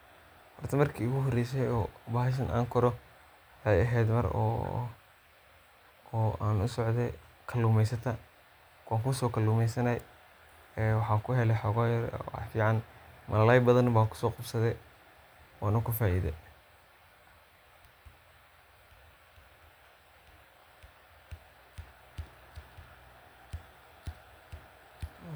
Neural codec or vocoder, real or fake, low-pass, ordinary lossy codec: none; real; none; none